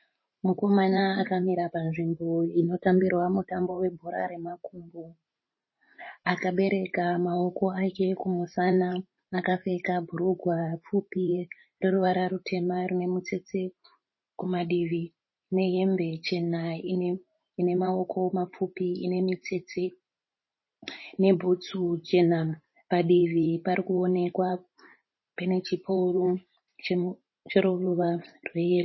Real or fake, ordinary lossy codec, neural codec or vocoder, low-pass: fake; MP3, 24 kbps; vocoder, 44.1 kHz, 128 mel bands every 512 samples, BigVGAN v2; 7.2 kHz